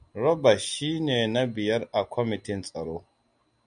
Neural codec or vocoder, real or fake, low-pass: none; real; 9.9 kHz